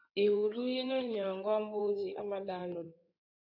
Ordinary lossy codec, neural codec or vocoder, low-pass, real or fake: AAC, 48 kbps; codec, 16 kHz in and 24 kHz out, 2.2 kbps, FireRedTTS-2 codec; 5.4 kHz; fake